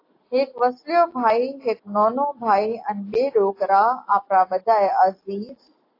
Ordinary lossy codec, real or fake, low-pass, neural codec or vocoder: AAC, 32 kbps; real; 5.4 kHz; none